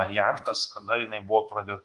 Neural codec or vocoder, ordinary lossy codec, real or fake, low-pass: codec, 24 kHz, 1.2 kbps, DualCodec; Opus, 24 kbps; fake; 10.8 kHz